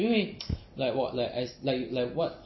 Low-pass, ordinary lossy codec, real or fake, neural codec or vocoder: 7.2 kHz; MP3, 24 kbps; real; none